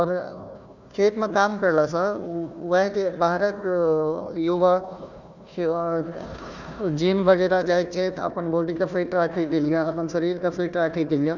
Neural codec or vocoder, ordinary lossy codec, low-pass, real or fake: codec, 16 kHz, 1 kbps, FunCodec, trained on Chinese and English, 50 frames a second; none; 7.2 kHz; fake